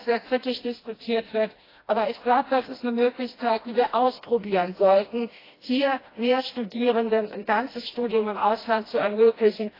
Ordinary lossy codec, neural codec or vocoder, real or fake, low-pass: AAC, 24 kbps; codec, 16 kHz, 1 kbps, FreqCodec, smaller model; fake; 5.4 kHz